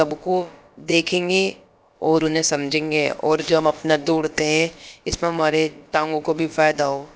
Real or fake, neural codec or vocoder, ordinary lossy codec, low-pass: fake; codec, 16 kHz, about 1 kbps, DyCAST, with the encoder's durations; none; none